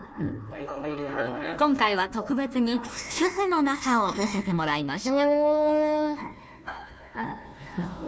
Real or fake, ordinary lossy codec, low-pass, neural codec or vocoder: fake; none; none; codec, 16 kHz, 1 kbps, FunCodec, trained on Chinese and English, 50 frames a second